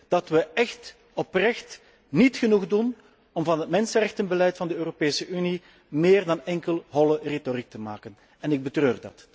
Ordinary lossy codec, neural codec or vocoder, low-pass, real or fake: none; none; none; real